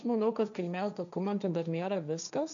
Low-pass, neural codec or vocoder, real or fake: 7.2 kHz; codec, 16 kHz, 1.1 kbps, Voila-Tokenizer; fake